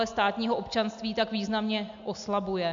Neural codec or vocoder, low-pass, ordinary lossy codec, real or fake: none; 7.2 kHz; AAC, 64 kbps; real